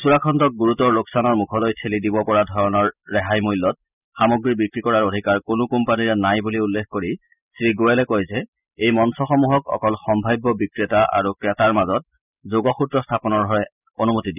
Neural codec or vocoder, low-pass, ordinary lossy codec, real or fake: none; 3.6 kHz; none; real